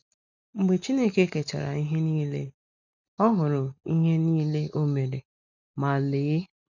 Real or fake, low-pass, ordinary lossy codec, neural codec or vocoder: real; 7.2 kHz; none; none